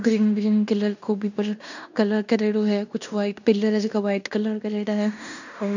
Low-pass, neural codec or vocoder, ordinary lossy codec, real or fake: 7.2 kHz; codec, 16 kHz in and 24 kHz out, 0.9 kbps, LongCat-Audio-Codec, fine tuned four codebook decoder; none; fake